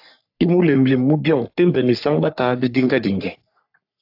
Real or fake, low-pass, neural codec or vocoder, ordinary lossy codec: fake; 5.4 kHz; codec, 44.1 kHz, 3.4 kbps, Pupu-Codec; AAC, 48 kbps